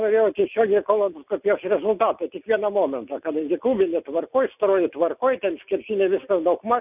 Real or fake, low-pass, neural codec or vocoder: real; 3.6 kHz; none